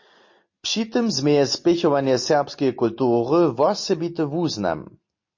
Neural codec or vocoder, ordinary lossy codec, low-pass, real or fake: none; MP3, 32 kbps; 7.2 kHz; real